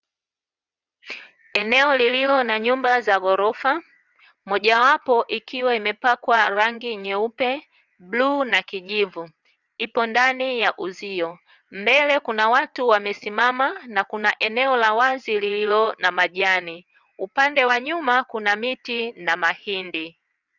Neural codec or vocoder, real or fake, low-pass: vocoder, 22.05 kHz, 80 mel bands, WaveNeXt; fake; 7.2 kHz